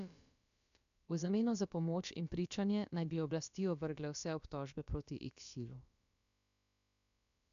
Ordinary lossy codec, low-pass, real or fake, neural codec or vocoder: none; 7.2 kHz; fake; codec, 16 kHz, about 1 kbps, DyCAST, with the encoder's durations